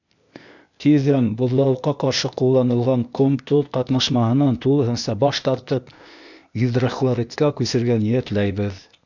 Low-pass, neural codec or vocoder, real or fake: 7.2 kHz; codec, 16 kHz, 0.8 kbps, ZipCodec; fake